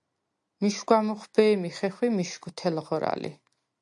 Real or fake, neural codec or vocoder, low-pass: real; none; 10.8 kHz